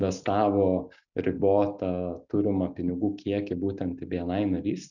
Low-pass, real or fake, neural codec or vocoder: 7.2 kHz; real; none